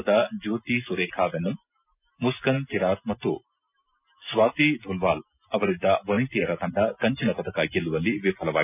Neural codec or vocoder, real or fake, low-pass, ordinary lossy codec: none; real; 3.6 kHz; none